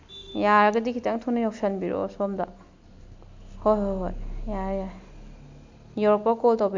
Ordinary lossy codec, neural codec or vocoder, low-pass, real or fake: MP3, 64 kbps; none; 7.2 kHz; real